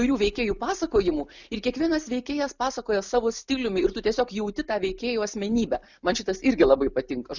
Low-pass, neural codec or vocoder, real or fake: 7.2 kHz; none; real